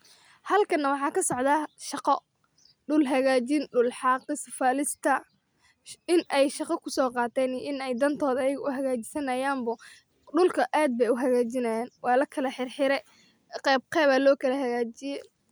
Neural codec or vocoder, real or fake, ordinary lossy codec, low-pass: none; real; none; none